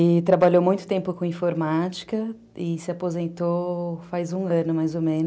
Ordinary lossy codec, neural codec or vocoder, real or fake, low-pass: none; none; real; none